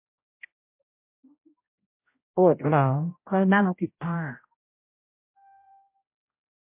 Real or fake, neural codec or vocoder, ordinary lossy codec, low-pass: fake; codec, 16 kHz, 0.5 kbps, X-Codec, HuBERT features, trained on general audio; MP3, 24 kbps; 3.6 kHz